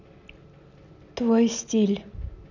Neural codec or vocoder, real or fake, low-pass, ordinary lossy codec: none; real; 7.2 kHz; none